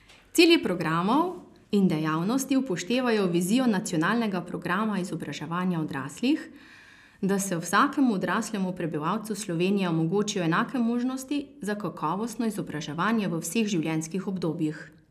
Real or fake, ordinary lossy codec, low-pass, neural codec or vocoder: real; none; 14.4 kHz; none